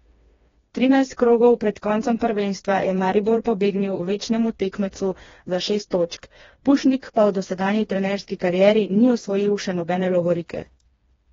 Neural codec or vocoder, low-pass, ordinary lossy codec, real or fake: codec, 16 kHz, 2 kbps, FreqCodec, smaller model; 7.2 kHz; AAC, 24 kbps; fake